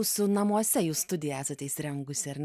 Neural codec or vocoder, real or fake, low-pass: none; real; 14.4 kHz